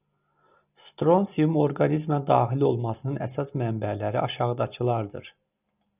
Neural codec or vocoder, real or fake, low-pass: none; real; 3.6 kHz